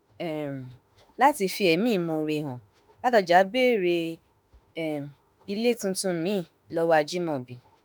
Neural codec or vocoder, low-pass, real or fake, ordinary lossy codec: autoencoder, 48 kHz, 32 numbers a frame, DAC-VAE, trained on Japanese speech; none; fake; none